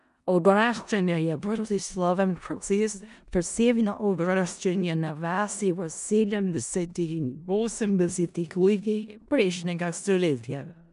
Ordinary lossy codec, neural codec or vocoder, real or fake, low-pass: none; codec, 16 kHz in and 24 kHz out, 0.4 kbps, LongCat-Audio-Codec, four codebook decoder; fake; 10.8 kHz